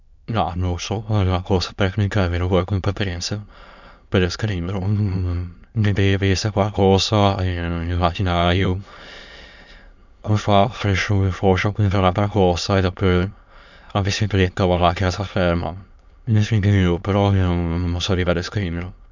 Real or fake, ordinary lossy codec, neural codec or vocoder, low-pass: fake; none; autoencoder, 22.05 kHz, a latent of 192 numbers a frame, VITS, trained on many speakers; 7.2 kHz